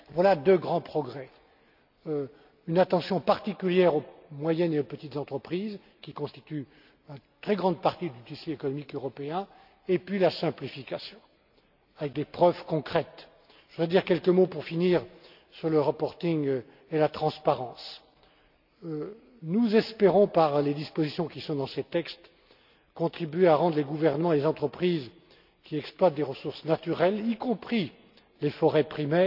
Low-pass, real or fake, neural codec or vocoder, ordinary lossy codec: 5.4 kHz; real; none; none